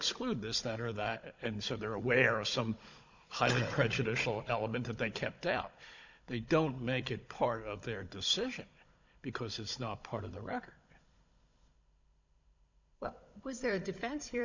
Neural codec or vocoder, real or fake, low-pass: codec, 16 kHz, 16 kbps, FunCodec, trained on Chinese and English, 50 frames a second; fake; 7.2 kHz